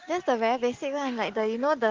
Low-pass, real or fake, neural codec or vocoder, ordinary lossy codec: 7.2 kHz; real; none; Opus, 16 kbps